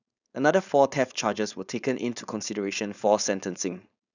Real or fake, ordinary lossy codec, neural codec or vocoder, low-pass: fake; none; codec, 16 kHz, 4.8 kbps, FACodec; 7.2 kHz